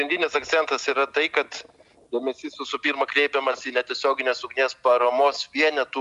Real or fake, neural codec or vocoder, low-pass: real; none; 10.8 kHz